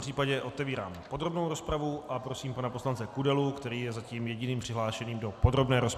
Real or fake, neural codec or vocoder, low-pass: real; none; 14.4 kHz